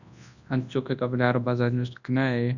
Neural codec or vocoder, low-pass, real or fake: codec, 24 kHz, 0.9 kbps, WavTokenizer, large speech release; 7.2 kHz; fake